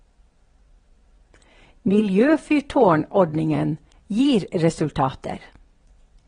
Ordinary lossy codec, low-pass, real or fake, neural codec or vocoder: AAC, 32 kbps; 9.9 kHz; fake; vocoder, 22.05 kHz, 80 mel bands, WaveNeXt